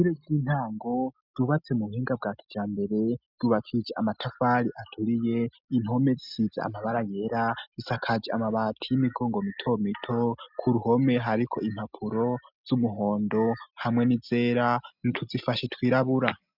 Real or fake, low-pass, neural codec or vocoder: real; 5.4 kHz; none